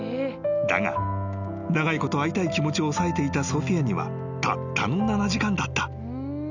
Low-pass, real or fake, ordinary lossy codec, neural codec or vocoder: 7.2 kHz; real; none; none